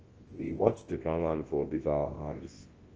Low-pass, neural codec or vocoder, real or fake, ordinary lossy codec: 7.2 kHz; codec, 24 kHz, 0.9 kbps, WavTokenizer, large speech release; fake; Opus, 16 kbps